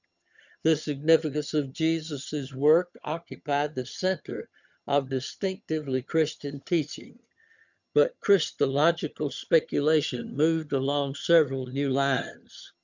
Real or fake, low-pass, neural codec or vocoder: fake; 7.2 kHz; vocoder, 22.05 kHz, 80 mel bands, HiFi-GAN